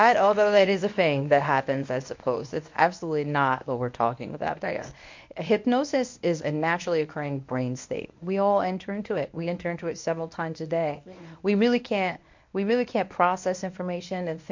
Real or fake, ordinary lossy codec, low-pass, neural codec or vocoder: fake; MP3, 48 kbps; 7.2 kHz; codec, 24 kHz, 0.9 kbps, WavTokenizer, medium speech release version 1